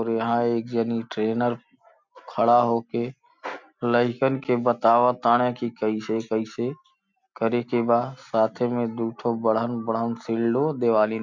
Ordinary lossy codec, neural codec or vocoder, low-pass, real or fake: MP3, 64 kbps; none; 7.2 kHz; real